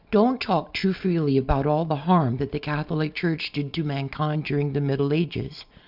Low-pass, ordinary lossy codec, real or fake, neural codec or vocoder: 5.4 kHz; Opus, 64 kbps; real; none